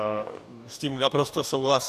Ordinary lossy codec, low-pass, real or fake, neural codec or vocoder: AAC, 96 kbps; 14.4 kHz; fake; codec, 44.1 kHz, 2.6 kbps, DAC